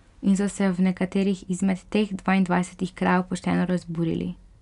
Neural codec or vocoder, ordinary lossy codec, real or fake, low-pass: none; none; real; 10.8 kHz